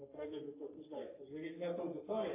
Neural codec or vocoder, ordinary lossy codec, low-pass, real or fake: codec, 44.1 kHz, 3.4 kbps, Pupu-Codec; AAC, 24 kbps; 3.6 kHz; fake